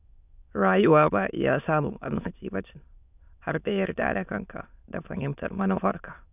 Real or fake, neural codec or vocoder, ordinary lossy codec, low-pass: fake; autoencoder, 22.05 kHz, a latent of 192 numbers a frame, VITS, trained on many speakers; none; 3.6 kHz